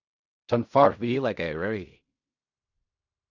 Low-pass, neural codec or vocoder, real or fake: 7.2 kHz; codec, 16 kHz in and 24 kHz out, 0.4 kbps, LongCat-Audio-Codec, fine tuned four codebook decoder; fake